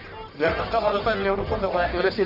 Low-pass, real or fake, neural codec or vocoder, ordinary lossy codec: 5.4 kHz; fake; codec, 44.1 kHz, 1.7 kbps, Pupu-Codec; none